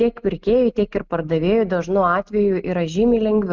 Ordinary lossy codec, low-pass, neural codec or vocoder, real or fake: Opus, 24 kbps; 7.2 kHz; none; real